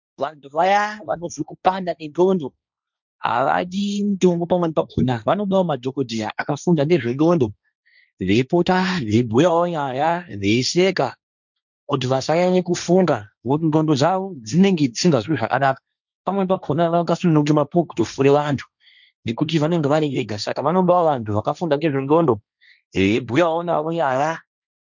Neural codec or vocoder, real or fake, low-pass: codec, 16 kHz, 1.1 kbps, Voila-Tokenizer; fake; 7.2 kHz